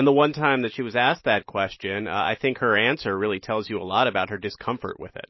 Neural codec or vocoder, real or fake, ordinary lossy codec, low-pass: none; real; MP3, 24 kbps; 7.2 kHz